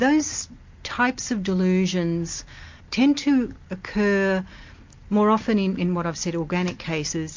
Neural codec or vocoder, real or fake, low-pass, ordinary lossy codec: none; real; 7.2 kHz; MP3, 48 kbps